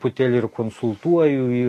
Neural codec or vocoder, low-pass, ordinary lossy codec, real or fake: none; 14.4 kHz; AAC, 48 kbps; real